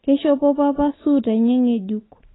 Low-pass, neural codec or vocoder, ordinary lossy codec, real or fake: 7.2 kHz; none; AAC, 16 kbps; real